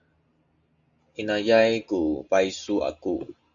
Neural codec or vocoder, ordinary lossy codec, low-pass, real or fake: none; MP3, 64 kbps; 7.2 kHz; real